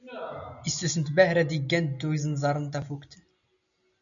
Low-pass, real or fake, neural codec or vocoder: 7.2 kHz; real; none